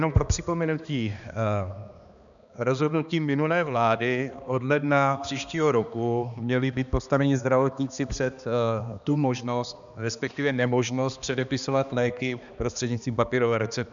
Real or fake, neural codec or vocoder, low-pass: fake; codec, 16 kHz, 2 kbps, X-Codec, HuBERT features, trained on balanced general audio; 7.2 kHz